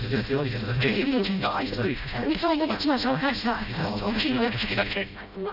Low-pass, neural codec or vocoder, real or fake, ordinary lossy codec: 5.4 kHz; codec, 16 kHz, 0.5 kbps, FreqCodec, smaller model; fake; none